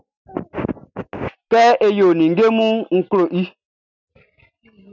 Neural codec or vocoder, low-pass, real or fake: none; 7.2 kHz; real